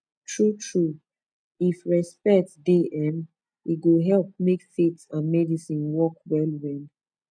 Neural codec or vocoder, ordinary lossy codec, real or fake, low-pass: none; none; real; 9.9 kHz